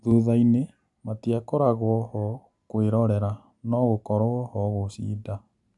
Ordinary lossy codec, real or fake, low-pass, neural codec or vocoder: none; real; 10.8 kHz; none